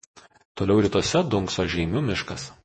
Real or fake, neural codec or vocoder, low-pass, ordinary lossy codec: fake; vocoder, 48 kHz, 128 mel bands, Vocos; 10.8 kHz; MP3, 32 kbps